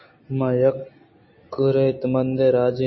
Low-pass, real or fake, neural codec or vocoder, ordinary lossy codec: 7.2 kHz; real; none; MP3, 24 kbps